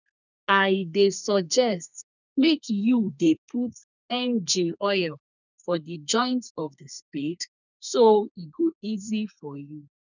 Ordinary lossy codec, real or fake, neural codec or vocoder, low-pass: none; fake; codec, 32 kHz, 1.9 kbps, SNAC; 7.2 kHz